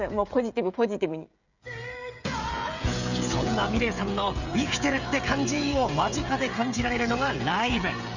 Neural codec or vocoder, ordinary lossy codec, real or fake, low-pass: codec, 16 kHz, 16 kbps, FreqCodec, smaller model; none; fake; 7.2 kHz